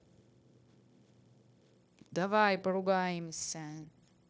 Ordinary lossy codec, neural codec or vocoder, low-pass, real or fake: none; codec, 16 kHz, 0.9 kbps, LongCat-Audio-Codec; none; fake